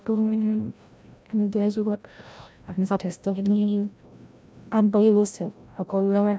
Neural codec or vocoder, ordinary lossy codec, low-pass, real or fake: codec, 16 kHz, 0.5 kbps, FreqCodec, larger model; none; none; fake